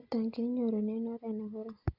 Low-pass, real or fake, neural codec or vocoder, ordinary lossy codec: 5.4 kHz; real; none; Opus, 64 kbps